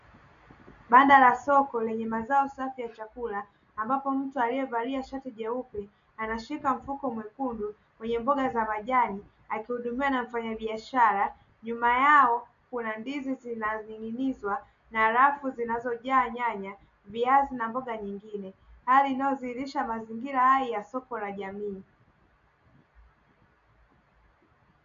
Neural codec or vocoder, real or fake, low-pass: none; real; 7.2 kHz